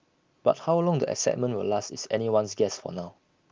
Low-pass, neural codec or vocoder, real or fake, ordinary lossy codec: 7.2 kHz; none; real; Opus, 32 kbps